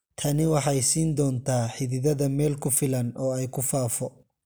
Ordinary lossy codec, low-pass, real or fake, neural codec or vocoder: none; none; real; none